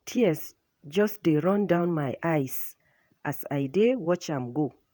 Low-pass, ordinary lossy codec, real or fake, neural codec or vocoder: none; none; fake; vocoder, 48 kHz, 128 mel bands, Vocos